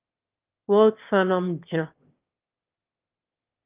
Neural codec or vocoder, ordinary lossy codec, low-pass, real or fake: autoencoder, 22.05 kHz, a latent of 192 numbers a frame, VITS, trained on one speaker; Opus, 24 kbps; 3.6 kHz; fake